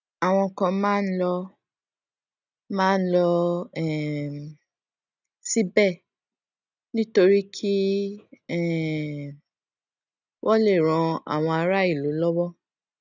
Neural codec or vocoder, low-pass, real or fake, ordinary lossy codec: none; 7.2 kHz; real; none